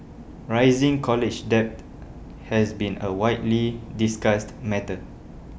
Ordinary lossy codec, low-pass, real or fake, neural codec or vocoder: none; none; real; none